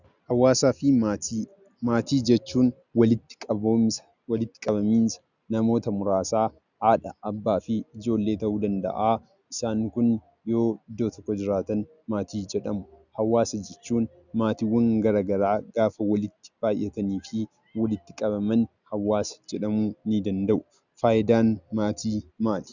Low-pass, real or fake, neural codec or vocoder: 7.2 kHz; real; none